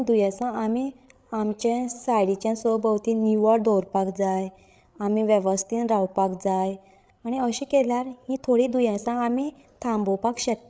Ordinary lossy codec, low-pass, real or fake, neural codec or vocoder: none; none; fake; codec, 16 kHz, 8 kbps, FreqCodec, larger model